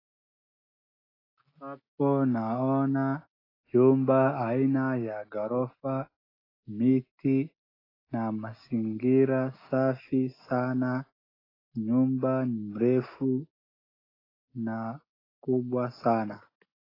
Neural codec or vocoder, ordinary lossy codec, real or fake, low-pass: none; AAC, 24 kbps; real; 5.4 kHz